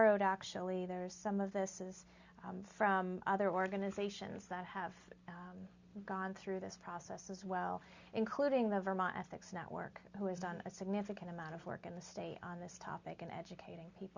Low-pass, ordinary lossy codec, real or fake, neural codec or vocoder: 7.2 kHz; Opus, 64 kbps; real; none